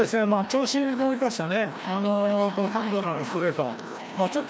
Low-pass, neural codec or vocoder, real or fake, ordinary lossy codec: none; codec, 16 kHz, 1 kbps, FreqCodec, larger model; fake; none